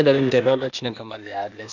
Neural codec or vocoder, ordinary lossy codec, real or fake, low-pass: codec, 16 kHz, 0.8 kbps, ZipCodec; none; fake; 7.2 kHz